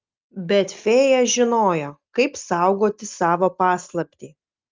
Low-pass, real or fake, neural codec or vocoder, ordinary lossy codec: 7.2 kHz; real; none; Opus, 32 kbps